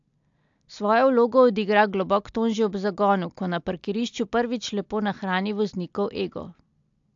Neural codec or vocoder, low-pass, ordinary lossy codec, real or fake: none; 7.2 kHz; AAC, 64 kbps; real